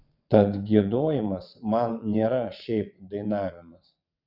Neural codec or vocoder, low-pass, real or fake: vocoder, 22.05 kHz, 80 mel bands, WaveNeXt; 5.4 kHz; fake